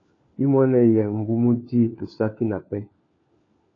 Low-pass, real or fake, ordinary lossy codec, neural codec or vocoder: 7.2 kHz; fake; AAC, 32 kbps; codec, 16 kHz, 4 kbps, FunCodec, trained on LibriTTS, 50 frames a second